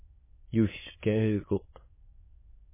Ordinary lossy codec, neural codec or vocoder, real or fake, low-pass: MP3, 16 kbps; autoencoder, 22.05 kHz, a latent of 192 numbers a frame, VITS, trained on many speakers; fake; 3.6 kHz